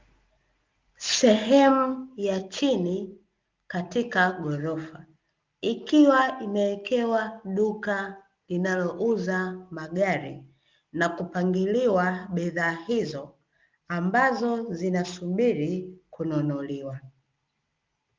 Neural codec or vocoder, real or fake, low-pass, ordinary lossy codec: none; real; 7.2 kHz; Opus, 32 kbps